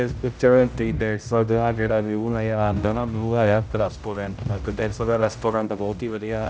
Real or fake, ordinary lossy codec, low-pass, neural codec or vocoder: fake; none; none; codec, 16 kHz, 0.5 kbps, X-Codec, HuBERT features, trained on general audio